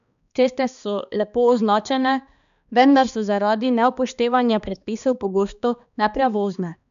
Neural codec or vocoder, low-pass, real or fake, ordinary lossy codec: codec, 16 kHz, 2 kbps, X-Codec, HuBERT features, trained on balanced general audio; 7.2 kHz; fake; none